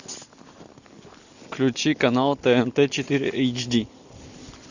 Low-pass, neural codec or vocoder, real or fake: 7.2 kHz; none; real